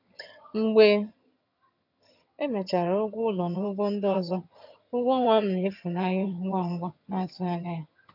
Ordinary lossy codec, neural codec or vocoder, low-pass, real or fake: none; vocoder, 22.05 kHz, 80 mel bands, HiFi-GAN; 5.4 kHz; fake